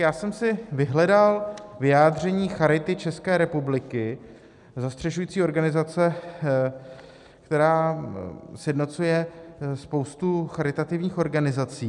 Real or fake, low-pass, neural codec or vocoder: real; 10.8 kHz; none